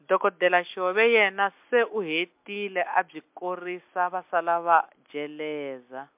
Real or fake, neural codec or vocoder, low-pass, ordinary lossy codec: real; none; 3.6 kHz; MP3, 32 kbps